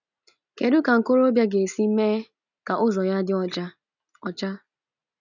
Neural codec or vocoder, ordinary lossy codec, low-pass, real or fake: none; none; 7.2 kHz; real